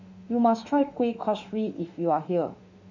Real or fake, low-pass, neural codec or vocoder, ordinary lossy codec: fake; 7.2 kHz; autoencoder, 48 kHz, 32 numbers a frame, DAC-VAE, trained on Japanese speech; none